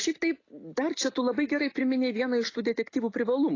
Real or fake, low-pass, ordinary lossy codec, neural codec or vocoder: real; 7.2 kHz; AAC, 32 kbps; none